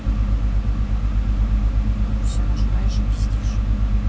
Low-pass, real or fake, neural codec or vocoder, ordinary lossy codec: none; real; none; none